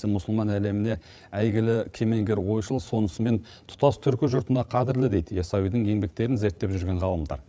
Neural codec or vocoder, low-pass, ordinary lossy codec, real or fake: codec, 16 kHz, 8 kbps, FreqCodec, larger model; none; none; fake